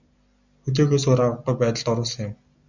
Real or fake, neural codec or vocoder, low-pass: real; none; 7.2 kHz